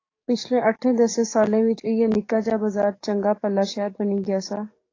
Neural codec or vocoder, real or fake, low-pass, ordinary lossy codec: codec, 44.1 kHz, 7.8 kbps, DAC; fake; 7.2 kHz; AAC, 32 kbps